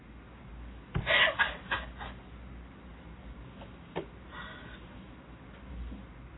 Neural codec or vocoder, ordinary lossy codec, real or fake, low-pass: none; AAC, 16 kbps; real; 7.2 kHz